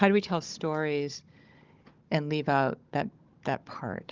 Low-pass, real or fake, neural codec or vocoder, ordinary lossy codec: 7.2 kHz; fake; codec, 16 kHz, 16 kbps, FunCodec, trained on Chinese and English, 50 frames a second; Opus, 32 kbps